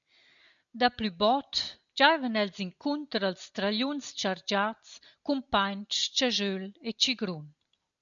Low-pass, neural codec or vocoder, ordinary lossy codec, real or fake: 7.2 kHz; none; MP3, 96 kbps; real